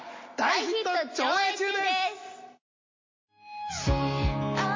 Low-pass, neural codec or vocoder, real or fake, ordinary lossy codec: 7.2 kHz; none; real; MP3, 32 kbps